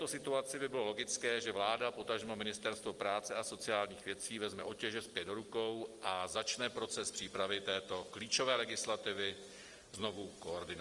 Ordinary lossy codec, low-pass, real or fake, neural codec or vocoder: Opus, 24 kbps; 10.8 kHz; real; none